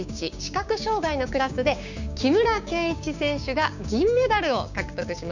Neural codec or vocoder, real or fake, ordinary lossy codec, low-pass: codec, 16 kHz, 6 kbps, DAC; fake; none; 7.2 kHz